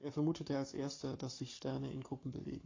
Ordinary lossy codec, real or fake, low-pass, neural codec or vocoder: AAC, 32 kbps; fake; 7.2 kHz; codec, 44.1 kHz, 7.8 kbps, Pupu-Codec